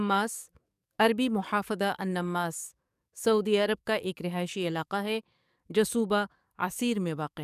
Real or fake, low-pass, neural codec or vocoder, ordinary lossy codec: fake; 14.4 kHz; codec, 44.1 kHz, 7.8 kbps, DAC; none